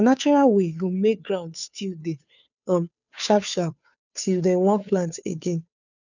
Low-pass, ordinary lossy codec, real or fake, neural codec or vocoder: 7.2 kHz; none; fake; codec, 16 kHz, 2 kbps, FunCodec, trained on Chinese and English, 25 frames a second